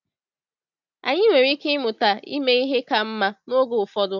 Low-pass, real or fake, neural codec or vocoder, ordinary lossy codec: 7.2 kHz; real; none; none